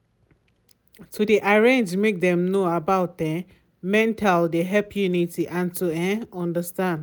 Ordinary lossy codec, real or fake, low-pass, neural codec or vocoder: none; real; none; none